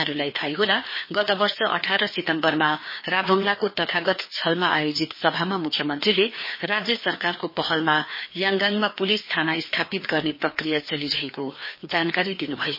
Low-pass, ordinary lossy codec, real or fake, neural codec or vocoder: 5.4 kHz; MP3, 24 kbps; fake; codec, 16 kHz, 2 kbps, FreqCodec, larger model